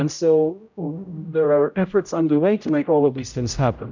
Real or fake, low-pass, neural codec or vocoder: fake; 7.2 kHz; codec, 16 kHz, 0.5 kbps, X-Codec, HuBERT features, trained on general audio